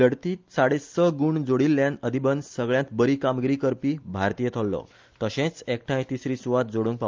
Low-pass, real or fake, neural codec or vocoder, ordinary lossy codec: 7.2 kHz; real; none; Opus, 24 kbps